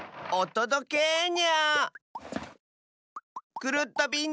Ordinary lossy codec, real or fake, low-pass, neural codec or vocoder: none; real; none; none